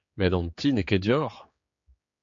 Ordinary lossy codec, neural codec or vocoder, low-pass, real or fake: MP3, 48 kbps; codec, 16 kHz, 4 kbps, X-Codec, HuBERT features, trained on general audio; 7.2 kHz; fake